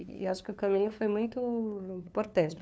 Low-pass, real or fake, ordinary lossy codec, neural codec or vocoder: none; fake; none; codec, 16 kHz, 2 kbps, FunCodec, trained on LibriTTS, 25 frames a second